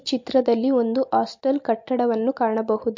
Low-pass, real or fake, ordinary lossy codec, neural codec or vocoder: 7.2 kHz; real; MP3, 48 kbps; none